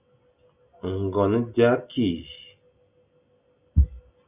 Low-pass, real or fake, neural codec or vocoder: 3.6 kHz; real; none